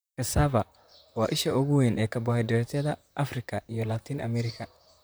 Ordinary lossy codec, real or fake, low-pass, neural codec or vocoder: none; fake; none; vocoder, 44.1 kHz, 128 mel bands, Pupu-Vocoder